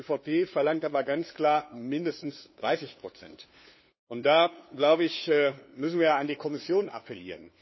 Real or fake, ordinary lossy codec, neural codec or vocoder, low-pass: fake; MP3, 24 kbps; codec, 16 kHz, 2 kbps, FunCodec, trained on LibriTTS, 25 frames a second; 7.2 kHz